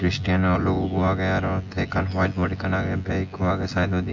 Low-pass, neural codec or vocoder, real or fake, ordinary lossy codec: 7.2 kHz; vocoder, 24 kHz, 100 mel bands, Vocos; fake; AAC, 48 kbps